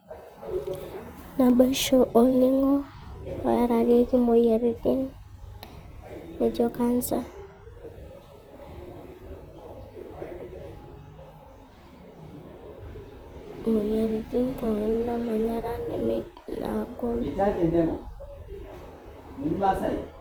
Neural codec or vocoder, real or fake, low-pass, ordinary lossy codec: vocoder, 44.1 kHz, 128 mel bands, Pupu-Vocoder; fake; none; none